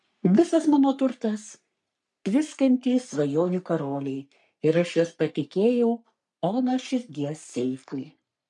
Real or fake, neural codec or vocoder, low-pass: fake; codec, 44.1 kHz, 3.4 kbps, Pupu-Codec; 10.8 kHz